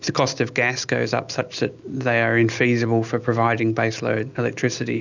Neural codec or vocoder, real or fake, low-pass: none; real; 7.2 kHz